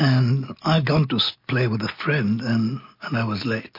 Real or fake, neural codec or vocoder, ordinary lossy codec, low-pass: real; none; MP3, 32 kbps; 5.4 kHz